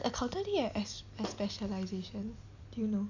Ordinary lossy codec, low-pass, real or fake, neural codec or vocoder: none; 7.2 kHz; real; none